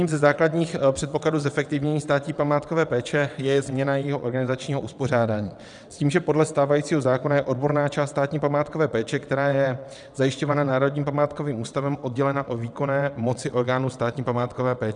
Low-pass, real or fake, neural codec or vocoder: 9.9 kHz; fake; vocoder, 22.05 kHz, 80 mel bands, WaveNeXt